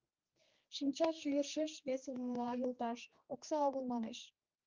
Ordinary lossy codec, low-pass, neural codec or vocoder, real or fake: Opus, 32 kbps; 7.2 kHz; codec, 16 kHz, 2 kbps, X-Codec, HuBERT features, trained on general audio; fake